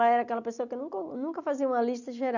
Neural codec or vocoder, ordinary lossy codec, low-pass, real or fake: none; none; 7.2 kHz; real